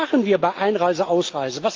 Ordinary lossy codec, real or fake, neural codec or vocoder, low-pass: Opus, 32 kbps; real; none; 7.2 kHz